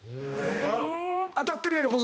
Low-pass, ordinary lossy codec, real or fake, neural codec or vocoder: none; none; fake; codec, 16 kHz, 1 kbps, X-Codec, HuBERT features, trained on balanced general audio